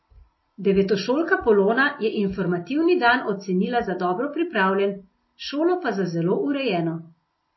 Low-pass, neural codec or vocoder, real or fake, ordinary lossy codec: 7.2 kHz; none; real; MP3, 24 kbps